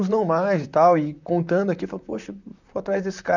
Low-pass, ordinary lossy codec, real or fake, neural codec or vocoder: 7.2 kHz; none; fake; vocoder, 44.1 kHz, 128 mel bands, Pupu-Vocoder